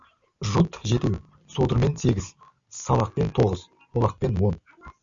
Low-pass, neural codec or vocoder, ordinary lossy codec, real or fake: 7.2 kHz; none; Opus, 64 kbps; real